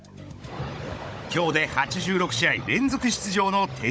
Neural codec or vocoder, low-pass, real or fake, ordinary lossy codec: codec, 16 kHz, 16 kbps, FunCodec, trained on Chinese and English, 50 frames a second; none; fake; none